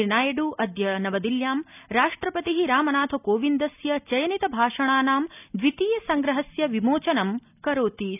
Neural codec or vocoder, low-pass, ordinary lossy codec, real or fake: none; 3.6 kHz; none; real